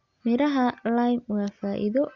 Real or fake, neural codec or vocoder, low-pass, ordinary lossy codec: real; none; 7.2 kHz; Opus, 64 kbps